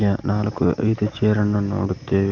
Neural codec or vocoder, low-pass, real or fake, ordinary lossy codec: none; 7.2 kHz; real; Opus, 24 kbps